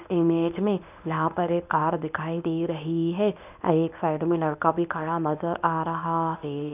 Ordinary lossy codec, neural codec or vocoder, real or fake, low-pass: none; codec, 24 kHz, 0.9 kbps, WavTokenizer, medium speech release version 1; fake; 3.6 kHz